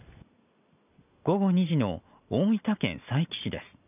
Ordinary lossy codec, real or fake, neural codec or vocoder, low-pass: none; real; none; 3.6 kHz